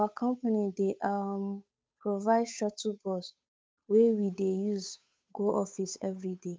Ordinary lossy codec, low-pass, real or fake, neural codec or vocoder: none; none; fake; codec, 16 kHz, 8 kbps, FunCodec, trained on Chinese and English, 25 frames a second